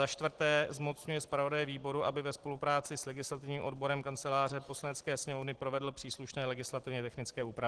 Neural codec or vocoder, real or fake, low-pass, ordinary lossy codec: none; real; 10.8 kHz; Opus, 16 kbps